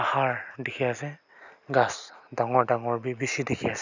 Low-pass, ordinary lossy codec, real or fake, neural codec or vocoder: 7.2 kHz; none; real; none